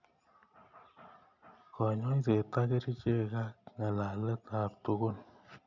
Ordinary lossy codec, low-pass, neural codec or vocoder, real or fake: none; 7.2 kHz; none; real